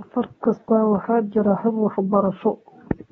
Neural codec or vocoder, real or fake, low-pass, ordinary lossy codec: codec, 24 kHz, 0.9 kbps, WavTokenizer, medium speech release version 2; fake; 10.8 kHz; AAC, 24 kbps